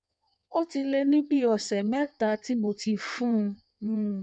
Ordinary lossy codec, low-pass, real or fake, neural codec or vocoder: none; 9.9 kHz; fake; codec, 16 kHz in and 24 kHz out, 1.1 kbps, FireRedTTS-2 codec